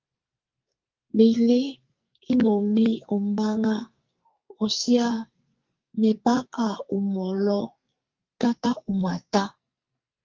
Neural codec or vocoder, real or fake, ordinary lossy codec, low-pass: codec, 44.1 kHz, 2.6 kbps, SNAC; fake; Opus, 32 kbps; 7.2 kHz